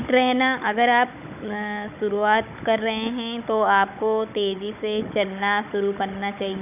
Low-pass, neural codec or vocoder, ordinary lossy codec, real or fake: 3.6 kHz; codec, 16 kHz, 16 kbps, FunCodec, trained on Chinese and English, 50 frames a second; none; fake